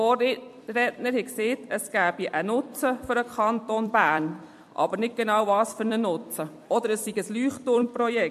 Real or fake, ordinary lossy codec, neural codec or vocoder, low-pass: fake; MP3, 64 kbps; vocoder, 44.1 kHz, 128 mel bands every 256 samples, BigVGAN v2; 14.4 kHz